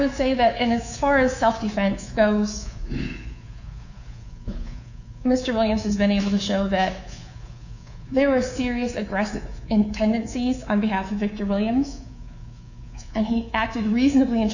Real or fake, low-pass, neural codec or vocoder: fake; 7.2 kHz; codec, 24 kHz, 3.1 kbps, DualCodec